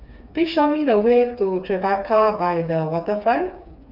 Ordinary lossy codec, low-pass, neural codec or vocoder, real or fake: none; 5.4 kHz; codec, 16 kHz, 4 kbps, FreqCodec, smaller model; fake